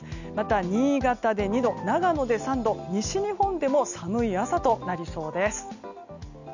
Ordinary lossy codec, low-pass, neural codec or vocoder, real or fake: none; 7.2 kHz; none; real